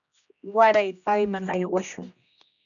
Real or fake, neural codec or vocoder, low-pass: fake; codec, 16 kHz, 1 kbps, X-Codec, HuBERT features, trained on general audio; 7.2 kHz